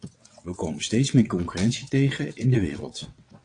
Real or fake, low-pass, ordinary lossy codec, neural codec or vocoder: fake; 9.9 kHz; AAC, 48 kbps; vocoder, 22.05 kHz, 80 mel bands, WaveNeXt